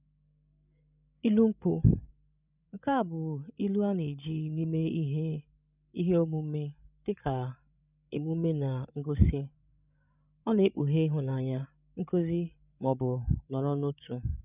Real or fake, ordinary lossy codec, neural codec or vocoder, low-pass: fake; none; codec, 16 kHz, 16 kbps, FreqCodec, larger model; 3.6 kHz